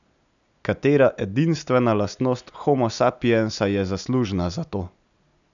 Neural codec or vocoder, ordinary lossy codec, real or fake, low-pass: none; none; real; 7.2 kHz